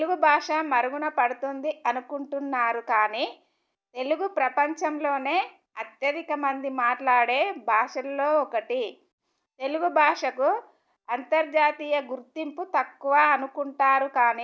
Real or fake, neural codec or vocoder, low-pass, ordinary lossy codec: real; none; none; none